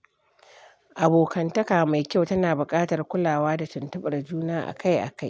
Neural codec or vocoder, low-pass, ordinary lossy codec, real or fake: none; none; none; real